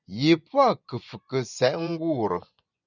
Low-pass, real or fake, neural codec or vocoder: 7.2 kHz; fake; vocoder, 24 kHz, 100 mel bands, Vocos